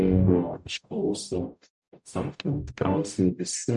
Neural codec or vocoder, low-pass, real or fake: codec, 44.1 kHz, 0.9 kbps, DAC; 10.8 kHz; fake